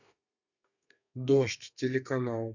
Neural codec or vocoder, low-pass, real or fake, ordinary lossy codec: codec, 32 kHz, 1.9 kbps, SNAC; 7.2 kHz; fake; none